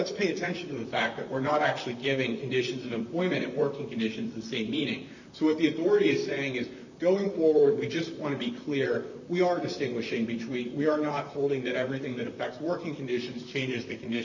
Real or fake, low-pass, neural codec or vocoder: fake; 7.2 kHz; vocoder, 44.1 kHz, 128 mel bands, Pupu-Vocoder